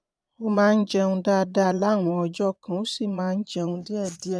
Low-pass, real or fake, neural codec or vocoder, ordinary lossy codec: none; fake; vocoder, 22.05 kHz, 80 mel bands, Vocos; none